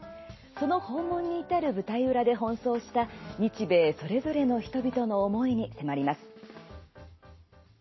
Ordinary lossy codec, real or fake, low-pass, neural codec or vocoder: MP3, 24 kbps; real; 7.2 kHz; none